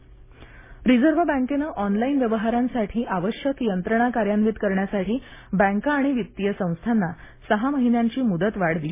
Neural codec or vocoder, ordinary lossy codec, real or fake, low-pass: none; MP3, 16 kbps; real; 3.6 kHz